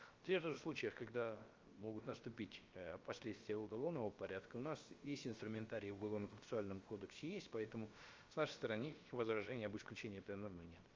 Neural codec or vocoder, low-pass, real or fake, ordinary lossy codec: codec, 16 kHz, about 1 kbps, DyCAST, with the encoder's durations; 7.2 kHz; fake; Opus, 32 kbps